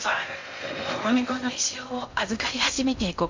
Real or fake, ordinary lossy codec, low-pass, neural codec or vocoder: fake; MP3, 48 kbps; 7.2 kHz; codec, 16 kHz in and 24 kHz out, 0.6 kbps, FocalCodec, streaming, 2048 codes